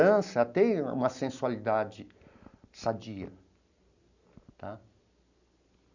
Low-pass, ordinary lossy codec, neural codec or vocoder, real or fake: 7.2 kHz; none; none; real